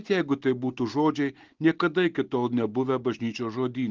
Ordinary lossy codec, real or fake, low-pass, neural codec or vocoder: Opus, 16 kbps; real; 7.2 kHz; none